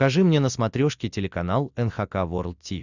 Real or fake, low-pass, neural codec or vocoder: real; 7.2 kHz; none